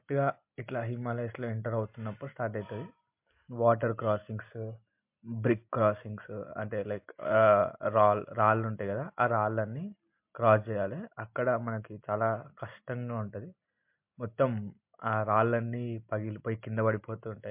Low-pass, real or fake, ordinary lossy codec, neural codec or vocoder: 3.6 kHz; real; MP3, 32 kbps; none